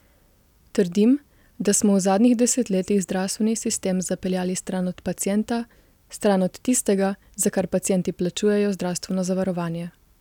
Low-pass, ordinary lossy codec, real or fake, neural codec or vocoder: 19.8 kHz; none; real; none